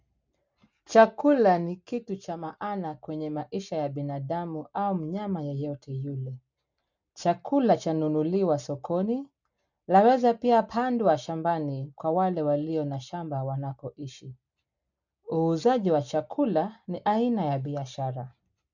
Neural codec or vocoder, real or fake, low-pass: none; real; 7.2 kHz